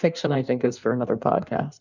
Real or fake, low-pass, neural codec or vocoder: fake; 7.2 kHz; codec, 16 kHz in and 24 kHz out, 1.1 kbps, FireRedTTS-2 codec